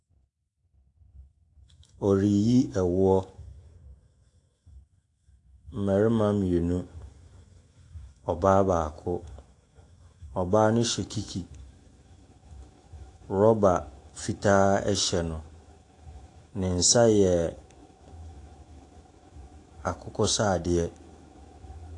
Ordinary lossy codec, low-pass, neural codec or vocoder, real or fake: AAC, 48 kbps; 10.8 kHz; autoencoder, 48 kHz, 128 numbers a frame, DAC-VAE, trained on Japanese speech; fake